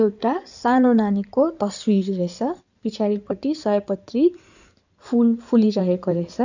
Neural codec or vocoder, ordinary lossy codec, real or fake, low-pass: codec, 16 kHz in and 24 kHz out, 2.2 kbps, FireRedTTS-2 codec; none; fake; 7.2 kHz